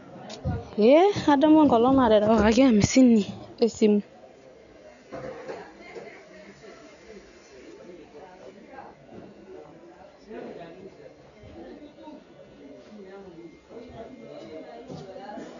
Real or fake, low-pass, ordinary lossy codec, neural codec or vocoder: real; 7.2 kHz; none; none